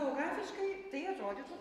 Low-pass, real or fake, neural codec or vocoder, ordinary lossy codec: 14.4 kHz; real; none; Opus, 64 kbps